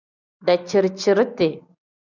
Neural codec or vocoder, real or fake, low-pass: none; real; 7.2 kHz